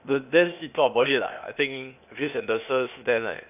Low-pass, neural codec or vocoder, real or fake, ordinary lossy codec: 3.6 kHz; codec, 16 kHz, 0.8 kbps, ZipCodec; fake; none